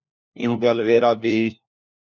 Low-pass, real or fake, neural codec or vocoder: 7.2 kHz; fake; codec, 16 kHz, 1 kbps, FunCodec, trained on LibriTTS, 50 frames a second